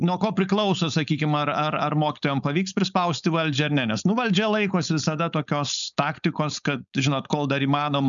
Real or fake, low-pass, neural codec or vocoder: fake; 7.2 kHz; codec, 16 kHz, 4.8 kbps, FACodec